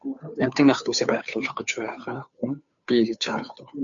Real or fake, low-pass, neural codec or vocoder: fake; 7.2 kHz; codec, 16 kHz, 2 kbps, FunCodec, trained on Chinese and English, 25 frames a second